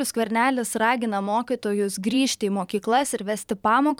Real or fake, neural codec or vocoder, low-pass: fake; vocoder, 44.1 kHz, 128 mel bands every 512 samples, BigVGAN v2; 19.8 kHz